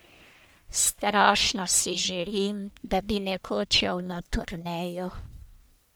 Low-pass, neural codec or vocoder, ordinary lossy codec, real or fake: none; codec, 44.1 kHz, 1.7 kbps, Pupu-Codec; none; fake